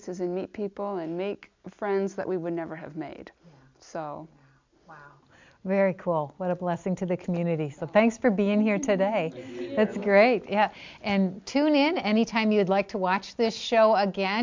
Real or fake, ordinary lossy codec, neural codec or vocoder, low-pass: real; MP3, 64 kbps; none; 7.2 kHz